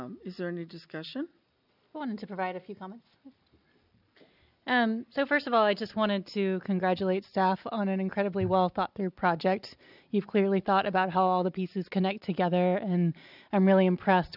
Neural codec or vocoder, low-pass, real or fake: none; 5.4 kHz; real